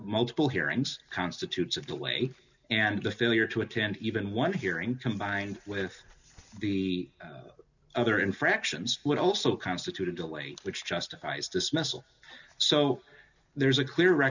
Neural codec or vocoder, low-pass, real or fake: none; 7.2 kHz; real